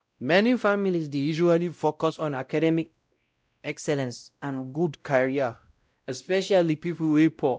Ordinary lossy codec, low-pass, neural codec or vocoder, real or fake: none; none; codec, 16 kHz, 0.5 kbps, X-Codec, WavLM features, trained on Multilingual LibriSpeech; fake